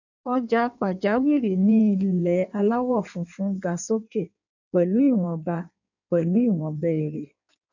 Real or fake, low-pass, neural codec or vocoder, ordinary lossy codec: fake; 7.2 kHz; codec, 16 kHz in and 24 kHz out, 1.1 kbps, FireRedTTS-2 codec; none